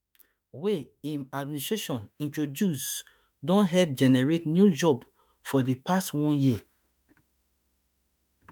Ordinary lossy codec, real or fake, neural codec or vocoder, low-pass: none; fake; autoencoder, 48 kHz, 32 numbers a frame, DAC-VAE, trained on Japanese speech; none